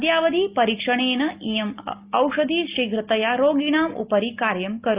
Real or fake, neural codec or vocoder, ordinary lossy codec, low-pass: real; none; Opus, 24 kbps; 3.6 kHz